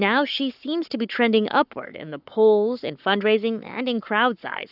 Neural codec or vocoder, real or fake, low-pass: codec, 44.1 kHz, 7.8 kbps, Pupu-Codec; fake; 5.4 kHz